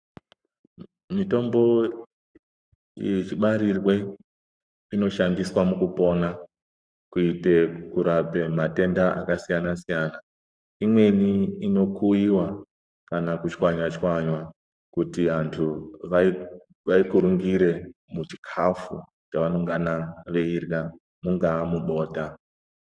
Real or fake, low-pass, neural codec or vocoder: fake; 9.9 kHz; codec, 44.1 kHz, 7.8 kbps, Pupu-Codec